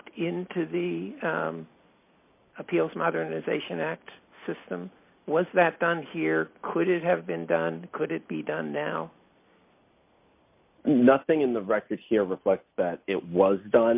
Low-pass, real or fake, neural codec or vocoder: 3.6 kHz; real; none